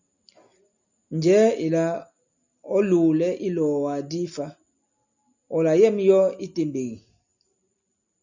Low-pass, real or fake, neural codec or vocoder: 7.2 kHz; real; none